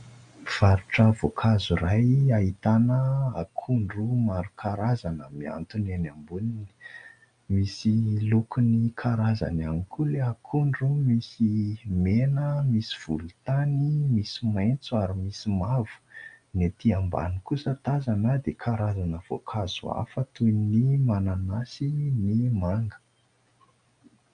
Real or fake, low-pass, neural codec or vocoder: real; 9.9 kHz; none